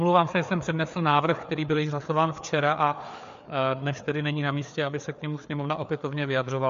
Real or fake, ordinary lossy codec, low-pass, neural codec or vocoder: fake; MP3, 48 kbps; 7.2 kHz; codec, 16 kHz, 4 kbps, FreqCodec, larger model